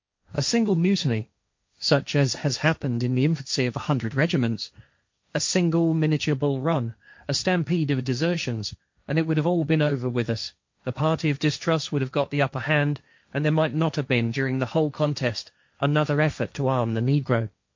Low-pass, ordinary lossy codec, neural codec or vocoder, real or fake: 7.2 kHz; MP3, 48 kbps; codec, 16 kHz, 1.1 kbps, Voila-Tokenizer; fake